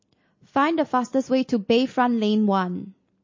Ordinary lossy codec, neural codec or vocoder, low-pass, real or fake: MP3, 32 kbps; none; 7.2 kHz; real